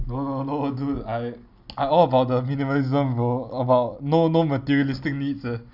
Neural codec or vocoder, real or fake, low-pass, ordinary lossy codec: none; real; 5.4 kHz; none